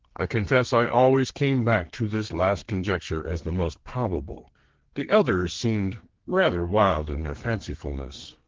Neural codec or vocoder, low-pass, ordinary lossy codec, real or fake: codec, 32 kHz, 1.9 kbps, SNAC; 7.2 kHz; Opus, 16 kbps; fake